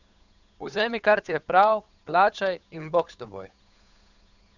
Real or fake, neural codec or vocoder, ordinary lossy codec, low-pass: fake; codec, 16 kHz, 8 kbps, FunCodec, trained on Chinese and English, 25 frames a second; none; 7.2 kHz